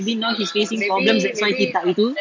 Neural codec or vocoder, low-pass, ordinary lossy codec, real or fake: none; 7.2 kHz; MP3, 48 kbps; real